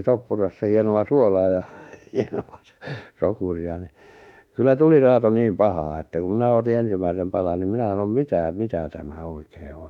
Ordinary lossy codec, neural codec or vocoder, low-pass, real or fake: none; autoencoder, 48 kHz, 32 numbers a frame, DAC-VAE, trained on Japanese speech; 19.8 kHz; fake